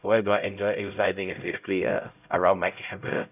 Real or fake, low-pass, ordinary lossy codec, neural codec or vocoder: fake; 3.6 kHz; none; codec, 16 kHz, 0.5 kbps, X-Codec, HuBERT features, trained on LibriSpeech